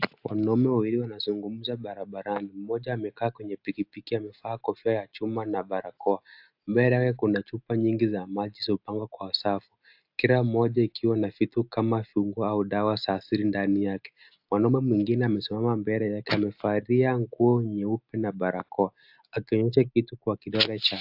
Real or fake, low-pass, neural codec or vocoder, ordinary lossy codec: real; 5.4 kHz; none; AAC, 48 kbps